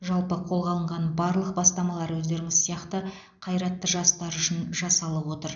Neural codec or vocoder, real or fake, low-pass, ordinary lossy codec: none; real; 7.2 kHz; none